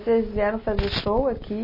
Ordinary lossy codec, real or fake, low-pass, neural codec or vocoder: MP3, 24 kbps; real; 5.4 kHz; none